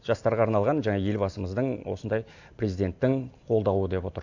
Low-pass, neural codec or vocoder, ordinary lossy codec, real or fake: 7.2 kHz; none; AAC, 48 kbps; real